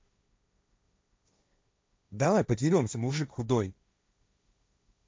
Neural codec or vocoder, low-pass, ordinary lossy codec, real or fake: codec, 16 kHz, 1.1 kbps, Voila-Tokenizer; none; none; fake